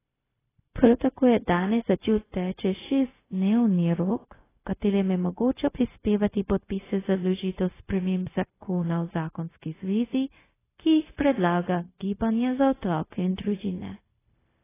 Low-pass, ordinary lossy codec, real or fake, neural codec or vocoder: 3.6 kHz; AAC, 16 kbps; fake; codec, 16 kHz, 0.4 kbps, LongCat-Audio-Codec